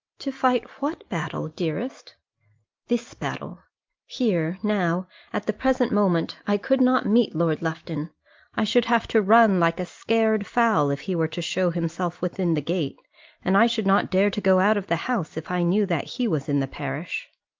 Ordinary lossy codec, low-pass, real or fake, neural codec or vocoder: Opus, 24 kbps; 7.2 kHz; real; none